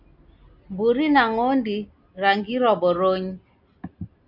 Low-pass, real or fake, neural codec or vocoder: 5.4 kHz; real; none